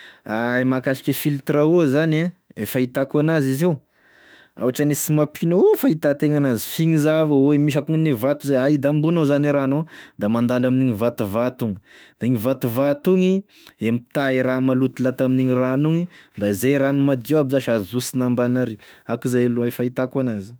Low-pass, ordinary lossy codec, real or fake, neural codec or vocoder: none; none; fake; autoencoder, 48 kHz, 32 numbers a frame, DAC-VAE, trained on Japanese speech